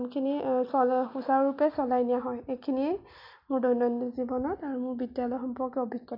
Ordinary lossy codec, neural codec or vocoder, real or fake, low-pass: MP3, 48 kbps; none; real; 5.4 kHz